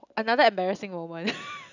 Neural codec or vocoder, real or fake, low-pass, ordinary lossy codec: none; real; 7.2 kHz; none